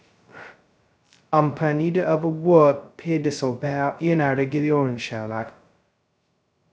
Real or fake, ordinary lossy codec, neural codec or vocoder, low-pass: fake; none; codec, 16 kHz, 0.2 kbps, FocalCodec; none